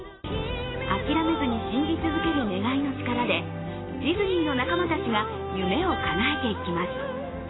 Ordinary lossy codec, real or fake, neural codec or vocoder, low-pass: AAC, 16 kbps; real; none; 7.2 kHz